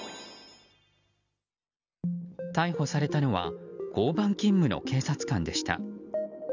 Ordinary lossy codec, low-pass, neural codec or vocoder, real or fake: none; 7.2 kHz; none; real